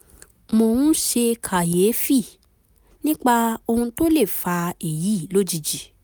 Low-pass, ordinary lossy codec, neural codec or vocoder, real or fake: none; none; none; real